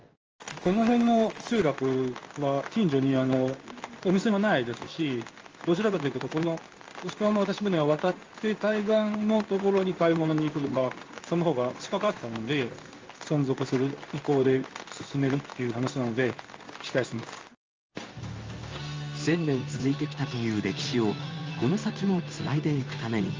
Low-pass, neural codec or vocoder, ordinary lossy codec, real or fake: 7.2 kHz; codec, 16 kHz in and 24 kHz out, 1 kbps, XY-Tokenizer; Opus, 24 kbps; fake